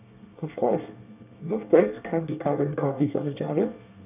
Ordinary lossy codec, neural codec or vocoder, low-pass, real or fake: none; codec, 24 kHz, 1 kbps, SNAC; 3.6 kHz; fake